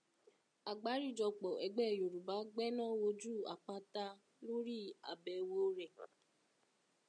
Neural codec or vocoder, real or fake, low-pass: none; real; 9.9 kHz